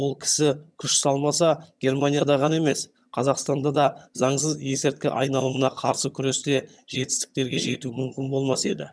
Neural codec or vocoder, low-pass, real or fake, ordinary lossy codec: vocoder, 22.05 kHz, 80 mel bands, HiFi-GAN; none; fake; none